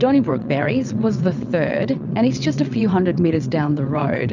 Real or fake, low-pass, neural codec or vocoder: fake; 7.2 kHz; vocoder, 22.05 kHz, 80 mel bands, WaveNeXt